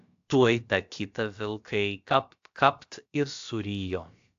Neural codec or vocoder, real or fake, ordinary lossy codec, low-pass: codec, 16 kHz, about 1 kbps, DyCAST, with the encoder's durations; fake; AAC, 64 kbps; 7.2 kHz